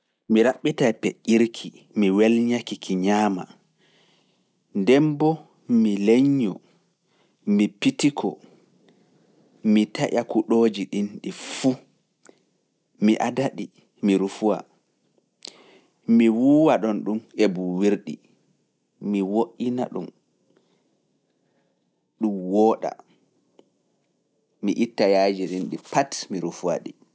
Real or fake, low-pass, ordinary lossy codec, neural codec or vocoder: real; none; none; none